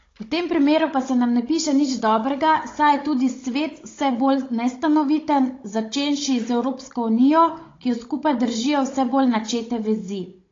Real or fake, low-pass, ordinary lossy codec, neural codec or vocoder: fake; 7.2 kHz; AAC, 32 kbps; codec, 16 kHz, 16 kbps, FunCodec, trained on Chinese and English, 50 frames a second